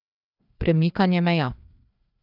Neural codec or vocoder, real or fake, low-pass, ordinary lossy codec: codec, 16 kHz, 2 kbps, FreqCodec, larger model; fake; 5.4 kHz; none